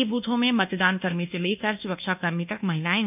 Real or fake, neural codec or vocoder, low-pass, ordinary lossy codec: fake; codec, 24 kHz, 1.2 kbps, DualCodec; 3.6 kHz; none